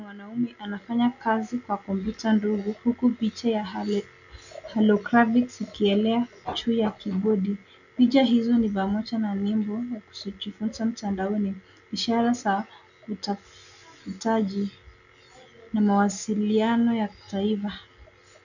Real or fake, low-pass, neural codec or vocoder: real; 7.2 kHz; none